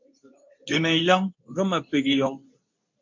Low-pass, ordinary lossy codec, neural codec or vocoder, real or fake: 7.2 kHz; MP3, 48 kbps; codec, 24 kHz, 0.9 kbps, WavTokenizer, medium speech release version 2; fake